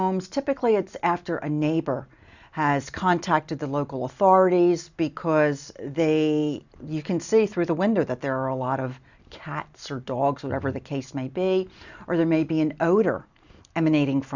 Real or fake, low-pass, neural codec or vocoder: real; 7.2 kHz; none